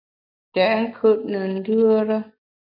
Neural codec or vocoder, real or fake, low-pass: none; real; 5.4 kHz